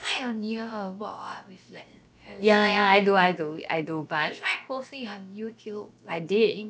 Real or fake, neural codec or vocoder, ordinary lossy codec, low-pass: fake; codec, 16 kHz, about 1 kbps, DyCAST, with the encoder's durations; none; none